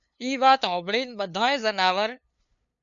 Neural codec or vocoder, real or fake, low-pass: codec, 16 kHz, 2 kbps, FunCodec, trained on LibriTTS, 25 frames a second; fake; 7.2 kHz